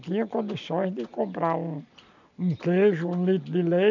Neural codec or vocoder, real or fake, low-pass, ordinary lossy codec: none; real; 7.2 kHz; none